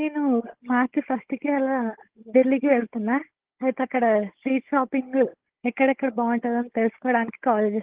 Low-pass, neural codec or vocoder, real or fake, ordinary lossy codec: 3.6 kHz; codec, 16 kHz, 16 kbps, FunCodec, trained on Chinese and English, 50 frames a second; fake; Opus, 16 kbps